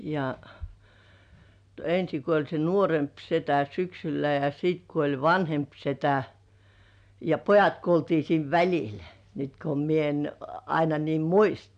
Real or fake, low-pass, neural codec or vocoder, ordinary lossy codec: real; 9.9 kHz; none; none